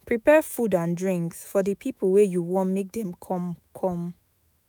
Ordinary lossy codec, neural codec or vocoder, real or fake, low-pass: none; autoencoder, 48 kHz, 128 numbers a frame, DAC-VAE, trained on Japanese speech; fake; none